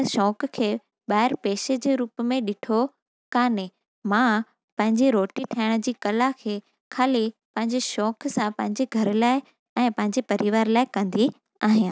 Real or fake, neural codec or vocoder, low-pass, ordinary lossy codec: real; none; none; none